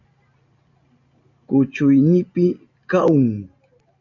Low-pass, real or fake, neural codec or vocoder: 7.2 kHz; real; none